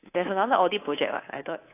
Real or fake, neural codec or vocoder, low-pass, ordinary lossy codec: fake; codec, 24 kHz, 3.1 kbps, DualCodec; 3.6 kHz; AAC, 24 kbps